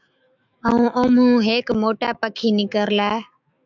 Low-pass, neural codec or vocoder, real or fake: 7.2 kHz; autoencoder, 48 kHz, 128 numbers a frame, DAC-VAE, trained on Japanese speech; fake